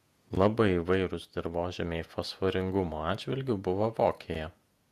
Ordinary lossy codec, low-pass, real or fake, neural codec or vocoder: MP3, 96 kbps; 14.4 kHz; fake; vocoder, 48 kHz, 128 mel bands, Vocos